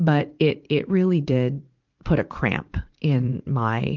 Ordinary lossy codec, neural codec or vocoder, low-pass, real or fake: Opus, 24 kbps; vocoder, 44.1 kHz, 80 mel bands, Vocos; 7.2 kHz; fake